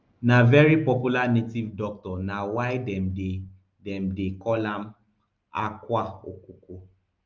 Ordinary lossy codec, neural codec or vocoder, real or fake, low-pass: Opus, 32 kbps; none; real; 7.2 kHz